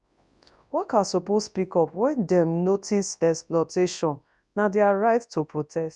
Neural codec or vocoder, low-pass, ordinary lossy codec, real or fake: codec, 24 kHz, 0.9 kbps, WavTokenizer, large speech release; 10.8 kHz; none; fake